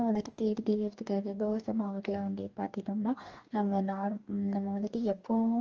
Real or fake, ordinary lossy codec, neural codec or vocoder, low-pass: fake; Opus, 24 kbps; codec, 44.1 kHz, 2.6 kbps, DAC; 7.2 kHz